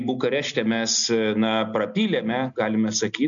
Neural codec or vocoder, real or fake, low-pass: none; real; 7.2 kHz